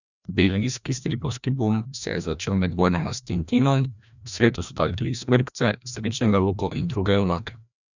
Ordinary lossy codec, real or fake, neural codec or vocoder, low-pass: none; fake; codec, 16 kHz, 1 kbps, FreqCodec, larger model; 7.2 kHz